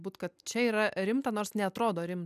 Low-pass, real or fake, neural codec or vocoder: 14.4 kHz; real; none